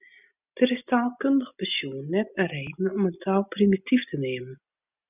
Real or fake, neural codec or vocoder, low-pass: real; none; 3.6 kHz